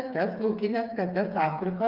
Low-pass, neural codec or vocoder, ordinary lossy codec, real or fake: 5.4 kHz; codec, 16 kHz, 4 kbps, FreqCodec, smaller model; Opus, 24 kbps; fake